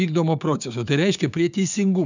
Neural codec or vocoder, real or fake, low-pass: codec, 16 kHz, 6 kbps, DAC; fake; 7.2 kHz